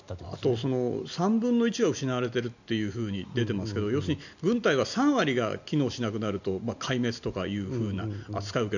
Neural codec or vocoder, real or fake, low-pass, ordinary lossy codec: none; real; 7.2 kHz; none